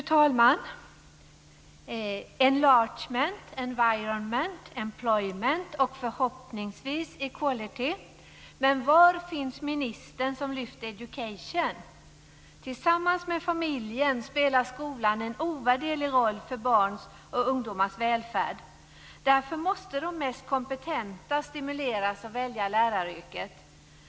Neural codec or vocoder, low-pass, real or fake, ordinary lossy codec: none; none; real; none